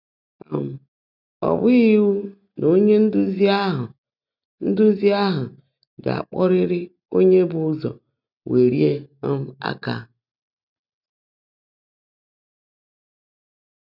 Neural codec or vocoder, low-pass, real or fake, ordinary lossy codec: none; 5.4 kHz; real; none